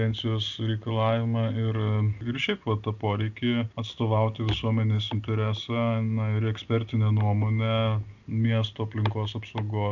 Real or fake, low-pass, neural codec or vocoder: real; 7.2 kHz; none